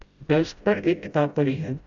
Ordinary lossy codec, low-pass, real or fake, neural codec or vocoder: AAC, 64 kbps; 7.2 kHz; fake; codec, 16 kHz, 0.5 kbps, FreqCodec, smaller model